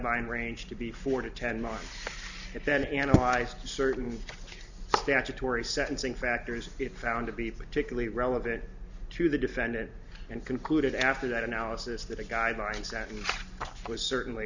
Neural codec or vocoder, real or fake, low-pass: none; real; 7.2 kHz